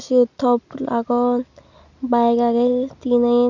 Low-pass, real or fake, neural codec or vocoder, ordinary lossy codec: 7.2 kHz; real; none; none